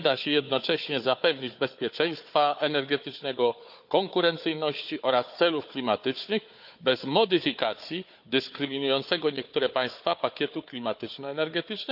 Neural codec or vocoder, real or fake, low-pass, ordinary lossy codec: codec, 16 kHz, 4 kbps, FunCodec, trained on Chinese and English, 50 frames a second; fake; 5.4 kHz; none